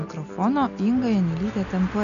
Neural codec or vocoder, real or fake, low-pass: none; real; 7.2 kHz